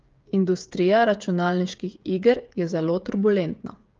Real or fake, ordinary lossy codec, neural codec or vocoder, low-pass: fake; Opus, 32 kbps; codec, 16 kHz, 8 kbps, FreqCodec, smaller model; 7.2 kHz